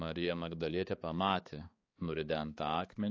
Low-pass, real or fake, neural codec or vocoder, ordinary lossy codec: 7.2 kHz; fake; codec, 16 kHz, 4 kbps, X-Codec, HuBERT features, trained on balanced general audio; AAC, 32 kbps